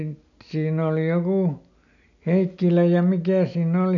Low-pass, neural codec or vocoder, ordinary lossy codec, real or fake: 7.2 kHz; none; none; real